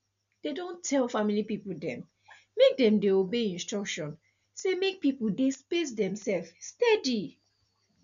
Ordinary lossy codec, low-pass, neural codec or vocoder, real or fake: none; 7.2 kHz; none; real